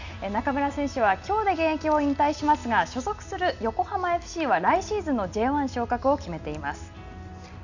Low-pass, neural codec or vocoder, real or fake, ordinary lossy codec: 7.2 kHz; none; real; none